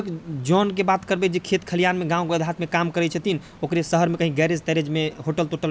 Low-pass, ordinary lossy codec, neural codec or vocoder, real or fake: none; none; none; real